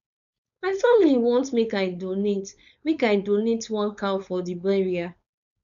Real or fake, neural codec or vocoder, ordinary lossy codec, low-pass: fake; codec, 16 kHz, 4.8 kbps, FACodec; none; 7.2 kHz